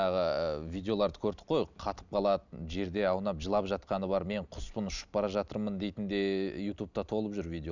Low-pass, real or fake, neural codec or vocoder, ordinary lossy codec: 7.2 kHz; real; none; none